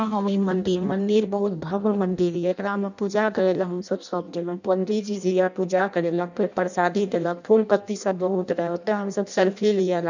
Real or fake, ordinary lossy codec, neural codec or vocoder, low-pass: fake; none; codec, 16 kHz in and 24 kHz out, 0.6 kbps, FireRedTTS-2 codec; 7.2 kHz